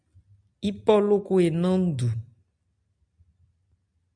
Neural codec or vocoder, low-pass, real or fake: none; 9.9 kHz; real